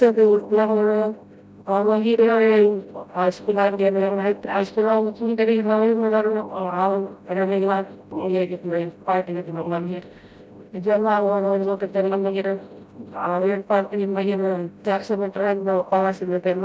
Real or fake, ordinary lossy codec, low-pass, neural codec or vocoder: fake; none; none; codec, 16 kHz, 0.5 kbps, FreqCodec, smaller model